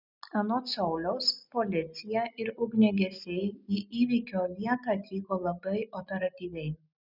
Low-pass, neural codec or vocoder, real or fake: 5.4 kHz; none; real